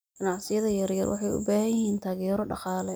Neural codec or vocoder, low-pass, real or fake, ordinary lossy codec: none; none; real; none